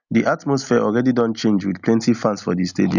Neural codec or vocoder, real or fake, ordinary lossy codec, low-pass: none; real; none; 7.2 kHz